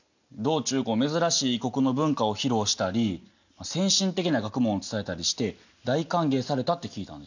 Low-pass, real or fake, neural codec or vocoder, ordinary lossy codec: 7.2 kHz; real; none; none